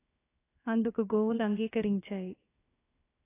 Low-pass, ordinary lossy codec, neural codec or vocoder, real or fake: 3.6 kHz; AAC, 16 kbps; codec, 16 kHz, 0.7 kbps, FocalCodec; fake